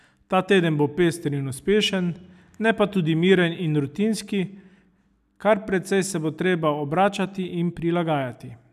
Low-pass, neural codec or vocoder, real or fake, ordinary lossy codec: 14.4 kHz; none; real; none